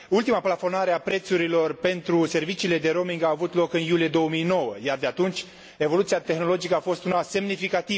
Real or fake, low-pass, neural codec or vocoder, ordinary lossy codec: real; none; none; none